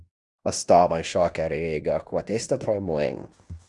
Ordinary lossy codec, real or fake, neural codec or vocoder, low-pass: Opus, 64 kbps; fake; codec, 16 kHz in and 24 kHz out, 0.9 kbps, LongCat-Audio-Codec, fine tuned four codebook decoder; 10.8 kHz